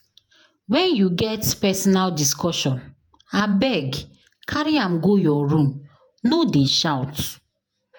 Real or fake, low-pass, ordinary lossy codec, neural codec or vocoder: fake; none; none; vocoder, 48 kHz, 128 mel bands, Vocos